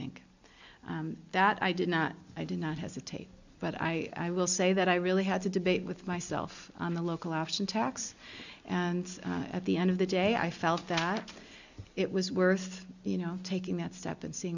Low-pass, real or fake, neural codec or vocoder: 7.2 kHz; real; none